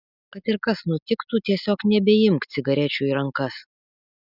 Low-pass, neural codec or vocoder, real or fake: 5.4 kHz; none; real